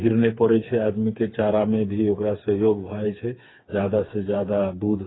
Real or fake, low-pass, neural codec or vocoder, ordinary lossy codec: fake; 7.2 kHz; codec, 16 kHz, 4 kbps, FreqCodec, smaller model; AAC, 16 kbps